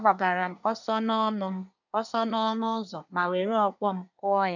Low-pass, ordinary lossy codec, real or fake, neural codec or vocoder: 7.2 kHz; none; fake; codec, 24 kHz, 1 kbps, SNAC